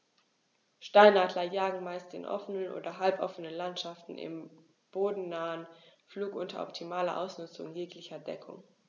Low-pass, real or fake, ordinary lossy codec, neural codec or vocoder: 7.2 kHz; real; none; none